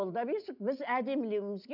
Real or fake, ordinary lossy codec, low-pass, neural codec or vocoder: real; none; 5.4 kHz; none